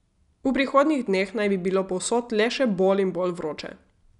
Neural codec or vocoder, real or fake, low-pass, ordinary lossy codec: none; real; 10.8 kHz; none